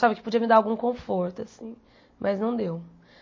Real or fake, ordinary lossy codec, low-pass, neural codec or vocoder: real; MP3, 32 kbps; 7.2 kHz; none